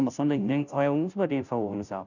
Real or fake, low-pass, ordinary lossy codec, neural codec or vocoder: fake; 7.2 kHz; none; codec, 16 kHz, 0.5 kbps, FunCodec, trained on Chinese and English, 25 frames a second